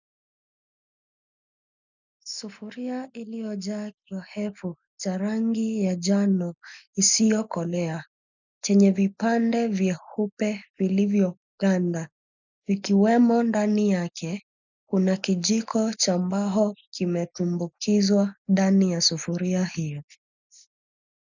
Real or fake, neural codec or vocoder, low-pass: real; none; 7.2 kHz